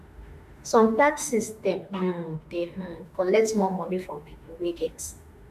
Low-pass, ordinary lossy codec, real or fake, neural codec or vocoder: 14.4 kHz; MP3, 96 kbps; fake; autoencoder, 48 kHz, 32 numbers a frame, DAC-VAE, trained on Japanese speech